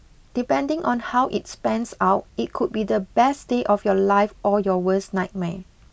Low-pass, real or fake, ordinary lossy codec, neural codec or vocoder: none; real; none; none